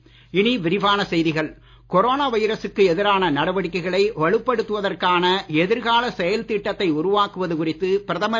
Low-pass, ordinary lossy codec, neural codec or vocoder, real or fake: none; none; none; real